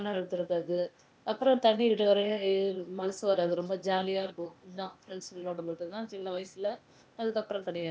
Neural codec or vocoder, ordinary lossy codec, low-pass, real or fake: codec, 16 kHz, 0.8 kbps, ZipCodec; none; none; fake